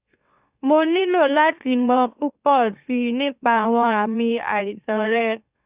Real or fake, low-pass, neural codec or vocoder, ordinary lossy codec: fake; 3.6 kHz; autoencoder, 44.1 kHz, a latent of 192 numbers a frame, MeloTTS; Opus, 64 kbps